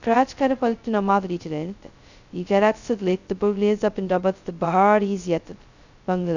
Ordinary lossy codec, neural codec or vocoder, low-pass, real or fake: none; codec, 16 kHz, 0.2 kbps, FocalCodec; 7.2 kHz; fake